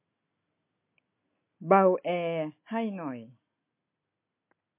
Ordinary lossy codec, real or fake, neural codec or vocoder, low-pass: MP3, 24 kbps; real; none; 3.6 kHz